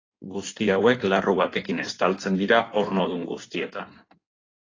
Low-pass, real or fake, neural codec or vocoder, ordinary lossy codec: 7.2 kHz; fake; codec, 16 kHz in and 24 kHz out, 1.1 kbps, FireRedTTS-2 codec; AAC, 32 kbps